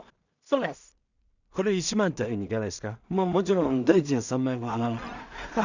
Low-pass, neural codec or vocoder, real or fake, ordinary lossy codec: 7.2 kHz; codec, 16 kHz in and 24 kHz out, 0.4 kbps, LongCat-Audio-Codec, two codebook decoder; fake; none